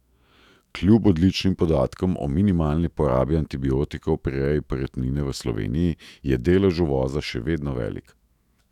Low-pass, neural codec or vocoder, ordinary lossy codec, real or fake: 19.8 kHz; autoencoder, 48 kHz, 128 numbers a frame, DAC-VAE, trained on Japanese speech; none; fake